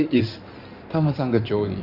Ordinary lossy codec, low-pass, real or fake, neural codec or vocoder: none; 5.4 kHz; fake; codec, 16 kHz in and 24 kHz out, 2.2 kbps, FireRedTTS-2 codec